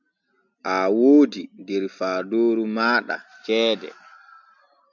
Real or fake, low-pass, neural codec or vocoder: real; 7.2 kHz; none